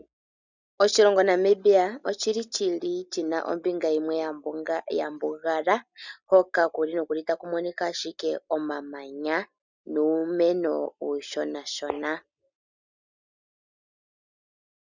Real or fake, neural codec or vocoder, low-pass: real; none; 7.2 kHz